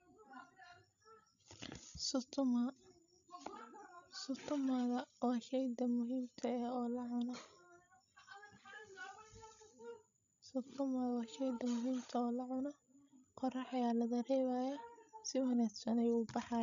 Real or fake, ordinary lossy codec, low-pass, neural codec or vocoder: fake; none; 7.2 kHz; codec, 16 kHz, 16 kbps, FreqCodec, larger model